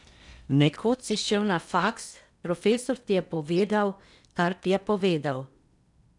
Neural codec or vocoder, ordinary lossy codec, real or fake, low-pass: codec, 16 kHz in and 24 kHz out, 0.8 kbps, FocalCodec, streaming, 65536 codes; none; fake; 10.8 kHz